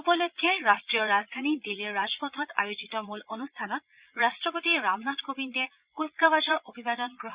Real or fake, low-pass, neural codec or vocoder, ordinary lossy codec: fake; 3.6 kHz; vocoder, 22.05 kHz, 80 mel bands, Vocos; Opus, 64 kbps